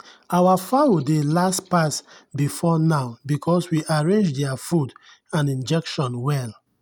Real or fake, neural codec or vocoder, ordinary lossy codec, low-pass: real; none; none; none